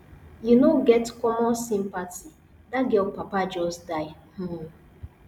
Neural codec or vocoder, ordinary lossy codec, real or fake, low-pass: none; none; real; 19.8 kHz